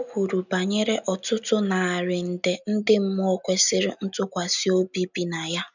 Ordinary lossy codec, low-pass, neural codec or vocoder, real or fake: none; 7.2 kHz; none; real